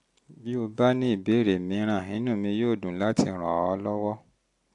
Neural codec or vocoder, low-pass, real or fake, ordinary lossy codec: none; 10.8 kHz; real; AAC, 64 kbps